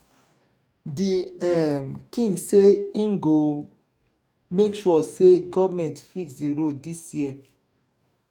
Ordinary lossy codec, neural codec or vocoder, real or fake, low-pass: none; codec, 44.1 kHz, 2.6 kbps, DAC; fake; 19.8 kHz